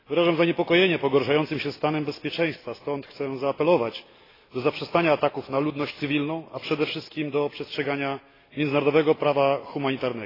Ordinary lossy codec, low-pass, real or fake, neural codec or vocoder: AAC, 24 kbps; 5.4 kHz; real; none